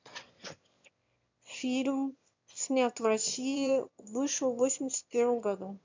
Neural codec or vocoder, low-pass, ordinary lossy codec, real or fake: autoencoder, 22.05 kHz, a latent of 192 numbers a frame, VITS, trained on one speaker; 7.2 kHz; MP3, 64 kbps; fake